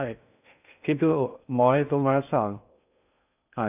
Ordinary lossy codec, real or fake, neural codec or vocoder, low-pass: none; fake; codec, 16 kHz in and 24 kHz out, 0.6 kbps, FocalCodec, streaming, 2048 codes; 3.6 kHz